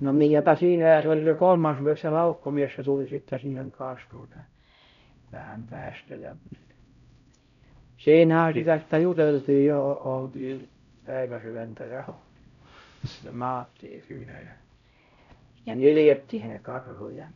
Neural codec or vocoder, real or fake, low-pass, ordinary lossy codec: codec, 16 kHz, 0.5 kbps, X-Codec, HuBERT features, trained on LibriSpeech; fake; 7.2 kHz; none